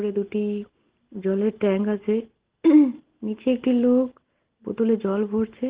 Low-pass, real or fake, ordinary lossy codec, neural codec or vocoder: 3.6 kHz; real; Opus, 16 kbps; none